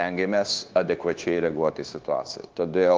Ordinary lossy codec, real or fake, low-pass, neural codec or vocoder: Opus, 16 kbps; fake; 7.2 kHz; codec, 16 kHz, 0.9 kbps, LongCat-Audio-Codec